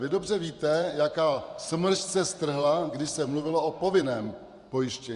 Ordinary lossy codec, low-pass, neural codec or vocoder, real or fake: Opus, 64 kbps; 10.8 kHz; vocoder, 24 kHz, 100 mel bands, Vocos; fake